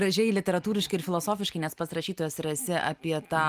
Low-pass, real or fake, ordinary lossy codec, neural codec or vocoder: 14.4 kHz; real; Opus, 32 kbps; none